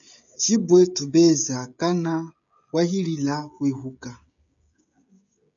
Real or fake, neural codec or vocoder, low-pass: fake; codec, 16 kHz, 16 kbps, FreqCodec, smaller model; 7.2 kHz